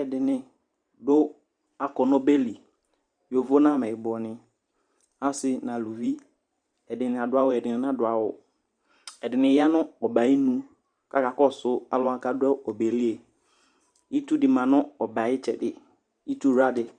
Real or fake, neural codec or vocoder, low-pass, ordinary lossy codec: fake; vocoder, 44.1 kHz, 128 mel bands every 512 samples, BigVGAN v2; 9.9 kHz; Opus, 64 kbps